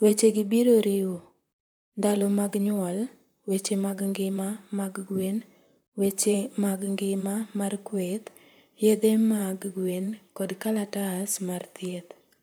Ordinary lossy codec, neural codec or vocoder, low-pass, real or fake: none; vocoder, 44.1 kHz, 128 mel bands, Pupu-Vocoder; none; fake